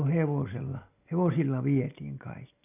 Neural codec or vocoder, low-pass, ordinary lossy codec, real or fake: none; 3.6 kHz; none; real